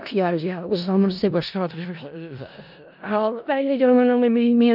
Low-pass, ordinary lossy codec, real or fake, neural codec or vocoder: 5.4 kHz; none; fake; codec, 16 kHz in and 24 kHz out, 0.4 kbps, LongCat-Audio-Codec, four codebook decoder